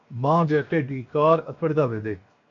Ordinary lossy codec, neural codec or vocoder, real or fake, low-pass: AAC, 48 kbps; codec, 16 kHz, 0.7 kbps, FocalCodec; fake; 7.2 kHz